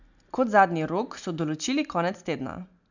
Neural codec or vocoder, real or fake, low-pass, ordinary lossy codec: none; real; 7.2 kHz; none